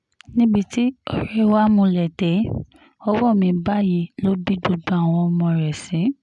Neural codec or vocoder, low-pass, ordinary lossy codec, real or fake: none; 10.8 kHz; none; real